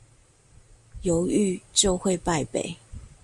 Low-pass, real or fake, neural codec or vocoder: 10.8 kHz; real; none